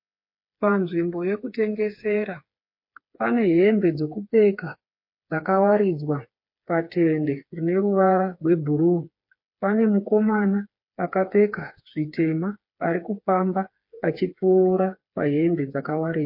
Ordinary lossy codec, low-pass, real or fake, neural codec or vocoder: MP3, 32 kbps; 5.4 kHz; fake; codec, 16 kHz, 4 kbps, FreqCodec, smaller model